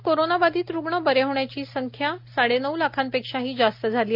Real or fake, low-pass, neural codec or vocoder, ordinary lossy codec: real; 5.4 kHz; none; MP3, 48 kbps